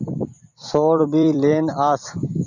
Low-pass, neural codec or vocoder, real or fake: 7.2 kHz; vocoder, 44.1 kHz, 128 mel bands every 256 samples, BigVGAN v2; fake